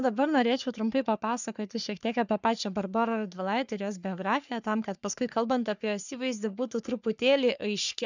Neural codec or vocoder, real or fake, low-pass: codec, 44.1 kHz, 3.4 kbps, Pupu-Codec; fake; 7.2 kHz